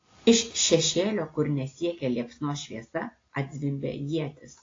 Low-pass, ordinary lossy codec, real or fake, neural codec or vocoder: 7.2 kHz; AAC, 32 kbps; real; none